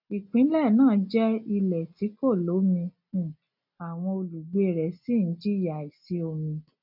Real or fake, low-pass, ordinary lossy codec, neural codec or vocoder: real; 5.4 kHz; none; none